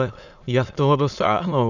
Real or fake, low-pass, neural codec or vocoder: fake; 7.2 kHz; autoencoder, 22.05 kHz, a latent of 192 numbers a frame, VITS, trained on many speakers